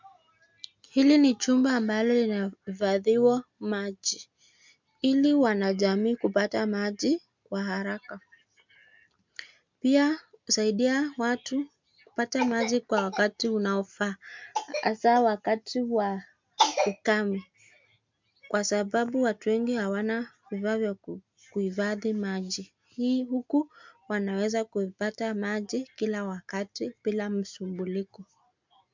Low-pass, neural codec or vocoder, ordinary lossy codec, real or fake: 7.2 kHz; none; MP3, 64 kbps; real